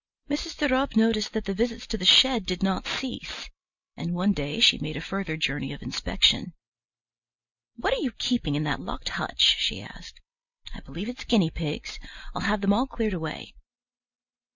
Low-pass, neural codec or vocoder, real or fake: 7.2 kHz; none; real